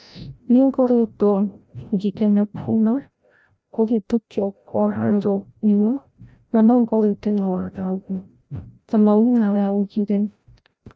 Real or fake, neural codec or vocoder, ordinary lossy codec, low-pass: fake; codec, 16 kHz, 0.5 kbps, FreqCodec, larger model; none; none